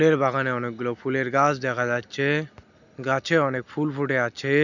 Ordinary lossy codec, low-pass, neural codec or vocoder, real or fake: none; 7.2 kHz; none; real